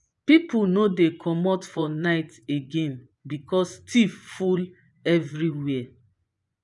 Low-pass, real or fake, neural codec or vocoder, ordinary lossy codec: 10.8 kHz; fake; vocoder, 24 kHz, 100 mel bands, Vocos; none